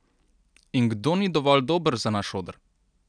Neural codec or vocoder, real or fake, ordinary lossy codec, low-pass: none; real; none; 9.9 kHz